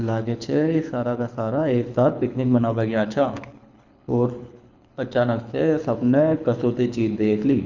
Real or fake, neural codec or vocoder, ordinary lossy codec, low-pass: fake; codec, 24 kHz, 6 kbps, HILCodec; none; 7.2 kHz